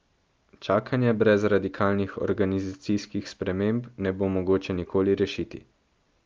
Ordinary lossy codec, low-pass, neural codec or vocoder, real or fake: Opus, 32 kbps; 7.2 kHz; none; real